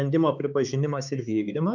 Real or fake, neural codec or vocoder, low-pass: fake; codec, 16 kHz, 4 kbps, X-Codec, HuBERT features, trained on balanced general audio; 7.2 kHz